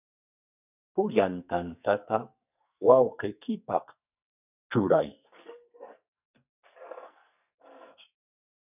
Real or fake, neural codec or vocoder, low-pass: fake; codec, 44.1 kHz, 2.6 kbps, SNAC; 3.6 kHz